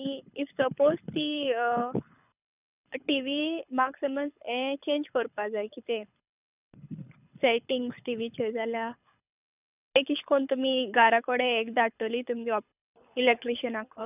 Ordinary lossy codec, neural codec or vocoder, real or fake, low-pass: none; codec, 24 kHz, 6 kbps, HILCodec; fake; 3.6 kHz